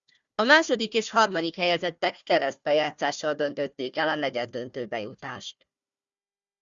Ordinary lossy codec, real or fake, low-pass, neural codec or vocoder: Opus, 64 kbps; fake; 7.2 kHz; codec, 16 kHz, 1 kbps, FunCodec, trained on Chinese and English, 50 frames a second